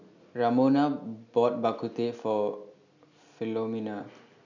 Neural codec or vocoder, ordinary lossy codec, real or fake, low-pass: none; none; real; 7.2 kHz